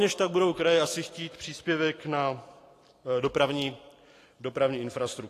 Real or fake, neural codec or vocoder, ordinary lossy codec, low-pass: fake; vocoder, 44.1 kHz, 128 mel bands every 512 samples, BigVGAN v2; AAC, 48 kbps; 14.4 kHz